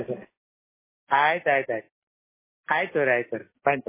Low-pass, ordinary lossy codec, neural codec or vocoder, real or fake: 3.6 kHz; MP3, 16 kbps; none; real